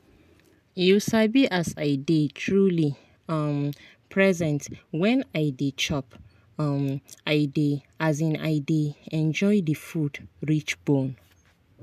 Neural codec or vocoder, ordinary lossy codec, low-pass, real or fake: none; none; 14.4 kHz; real